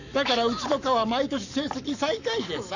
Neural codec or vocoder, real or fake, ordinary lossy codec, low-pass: codec, 44.1 kHz, 7.8 kbps, Pupu-Codec; fake; none; 7.2 kHz